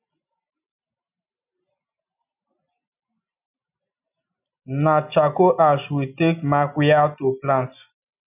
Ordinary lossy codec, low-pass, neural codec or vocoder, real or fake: none; 3.6 kHz; none; real